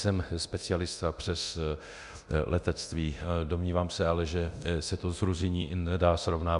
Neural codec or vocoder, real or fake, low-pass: codec, 24 kHz, 0.9 kbps, DualCodec; fake; 10.8 kHz